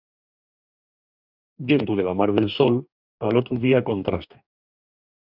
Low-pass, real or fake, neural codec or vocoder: 5.4 kHz; fake; codec, 44.1 kHz, 2.6 kbps, DAC